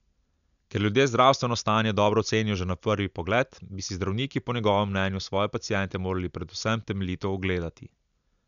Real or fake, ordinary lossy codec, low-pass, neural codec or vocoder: real; none; 7.2 kHz; none